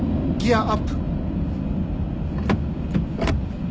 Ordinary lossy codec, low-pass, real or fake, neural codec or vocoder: none; none; real; none